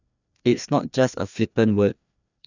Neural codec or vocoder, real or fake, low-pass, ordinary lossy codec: codec, 16 kHz, 2 kbps, FreqCodec, larger model; fake; 7.2 kHz; none